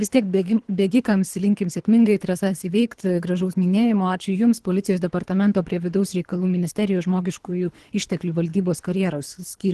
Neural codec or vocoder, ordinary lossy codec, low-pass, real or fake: codec, 24 kHz, 3 kbps, HILCodec; Opus, 16 kbps; 10.8 kHz; fake